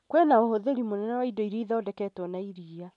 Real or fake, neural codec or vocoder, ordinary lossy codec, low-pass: real; none; none; 10.8 kHz